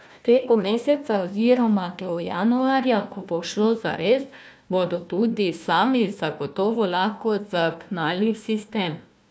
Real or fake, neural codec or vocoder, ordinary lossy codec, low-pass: fake; codec, 16 kHz, 1 kbps, FunCodec, trained on Chinese and English, 50 frames a second; none; none